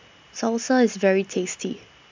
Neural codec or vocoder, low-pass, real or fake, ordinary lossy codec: none; 7.2 kHz; real; MP3, 64 kbps